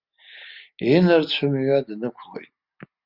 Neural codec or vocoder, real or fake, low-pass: none; real; 5.4 kHz